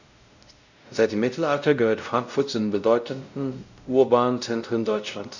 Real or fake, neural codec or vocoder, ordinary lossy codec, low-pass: fake; codec, 16 kHz, 0.5 kbps, X-Codec, WavLM features, trained on Multilingual LibriSpeech; none; 7.2 kHz